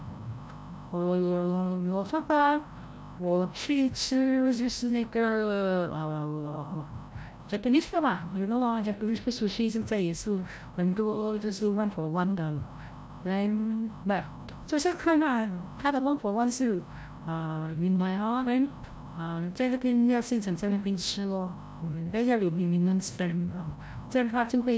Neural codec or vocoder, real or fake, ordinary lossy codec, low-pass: codec, 16 kHz, 0.5 kbps, FreqCodec, larger model; fake; none; none